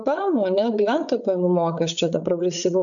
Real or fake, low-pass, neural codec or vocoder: fake; 7.2 kHz; codec, 16 kHz, 8 kbps, FreqCodec, larger model